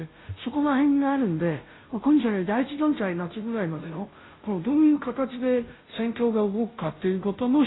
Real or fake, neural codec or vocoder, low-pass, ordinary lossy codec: fake; codec, 16 kHz, 0.5 kbps, FunCodec, trained on Chinese and English, 25 frames a second; 7.2 kHz; AAC, 16 kbps